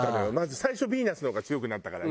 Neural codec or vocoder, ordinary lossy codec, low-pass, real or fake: none; none; none; real